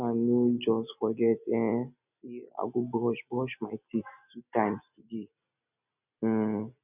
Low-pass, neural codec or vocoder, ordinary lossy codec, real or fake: 3.6 kHz; none; none; real